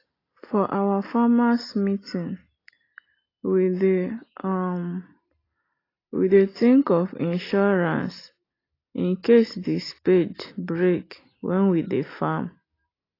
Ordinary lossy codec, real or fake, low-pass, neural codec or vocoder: AAC, 24 kbps; real; 5.4 kHz; none